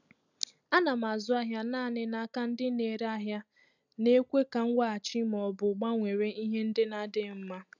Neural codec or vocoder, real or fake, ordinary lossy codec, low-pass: none; real; none; 7.2 kHz